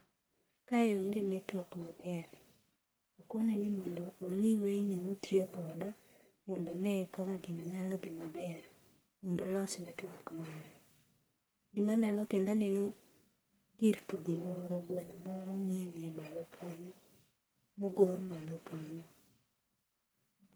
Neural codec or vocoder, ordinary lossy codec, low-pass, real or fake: codec, 44.1 kHz, 1.7 kbps, Pupu-Codec; none; none; fake